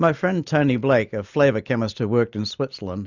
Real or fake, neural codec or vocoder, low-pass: real; none; 7.2 kHz